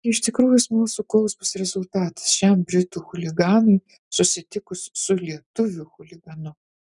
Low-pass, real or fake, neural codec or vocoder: 10.8 kHz; real; none